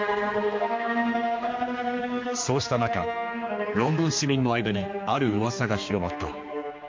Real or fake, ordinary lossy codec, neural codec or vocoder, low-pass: fake; MP3, 48 kbps; codec, 16 kHz, 2 kbps, X-Codec, HuBERT features, trained on general audio; 7.2 kHz